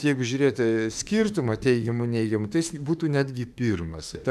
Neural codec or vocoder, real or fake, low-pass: autoencoder, 48 kHz, 32 numbers a frame, DAC-VAE, trained on Japanese speech; fake; 14.4 kHz